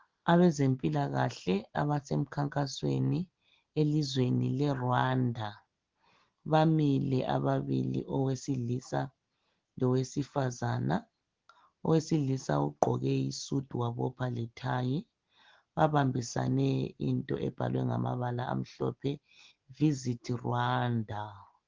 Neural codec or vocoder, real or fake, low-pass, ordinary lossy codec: none; real; 7.2 kHz; Opus, 16 kbps